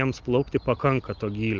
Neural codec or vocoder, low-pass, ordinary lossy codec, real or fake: none; 7.2 kHz; Opus, 24 kbps; real